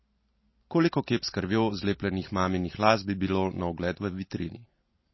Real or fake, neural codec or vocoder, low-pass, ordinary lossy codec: real; none; 7.2 kHz; MP3, 24 kbps